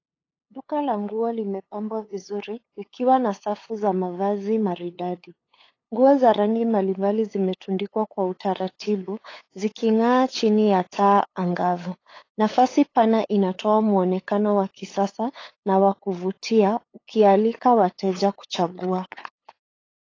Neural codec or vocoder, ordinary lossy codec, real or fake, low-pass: codec, 16 kHz, 8 kbps, FunCodec, trained on LibriTTS, 25 frames a second; AAC, 32 kbps; fake; 7.2 kHz